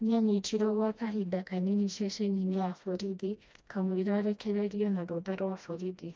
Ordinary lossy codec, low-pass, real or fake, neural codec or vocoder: none; none; fake; codec, 16 kHz, 1 kbps, FreqCodec, smaller model